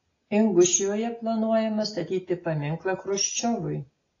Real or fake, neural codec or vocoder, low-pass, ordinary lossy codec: real; none; 7.2 kHz; AAC, 32 kbps